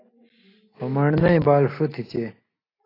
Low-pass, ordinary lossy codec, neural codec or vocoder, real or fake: 5.4 kHz; AAC, 24 kbps; none; real